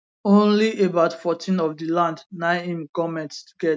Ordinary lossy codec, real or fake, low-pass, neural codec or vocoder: none; real; none; none